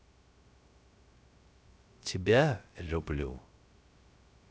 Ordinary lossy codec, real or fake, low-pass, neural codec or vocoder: none; fake; none; codec, 16 kHz, 0.3 kbps, FocalCodec